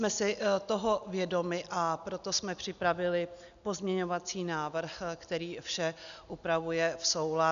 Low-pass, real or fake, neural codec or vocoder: 7.2 kHz; real; none